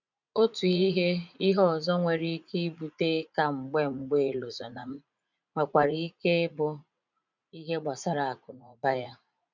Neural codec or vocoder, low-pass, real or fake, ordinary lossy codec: vocoder, 44.1 kHz, 80 mel bands, Vocos; 7.2 kHz; fake; none